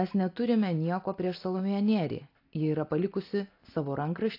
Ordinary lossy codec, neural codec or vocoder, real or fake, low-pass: AAC, 32 kbps; none; real; 5.4 kHz